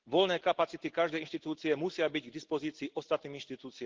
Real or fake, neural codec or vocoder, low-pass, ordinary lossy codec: real; none; 7.2 kHz; Opus, 16 kbps